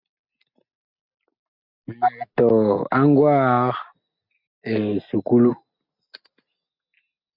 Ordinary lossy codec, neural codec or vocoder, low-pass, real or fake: MP3, 48 kbps; none; 5.4 kHz; real